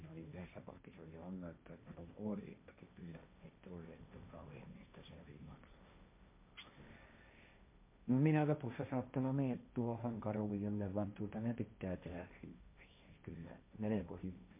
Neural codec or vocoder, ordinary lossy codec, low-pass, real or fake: codec, 16 kHz, 1.1 kbps, Voila-Tokenizer; MP3, 32 kbps; 3.6 kHz; fake